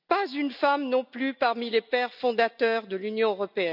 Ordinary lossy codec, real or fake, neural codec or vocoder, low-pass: none; real; none; 5.4 kHz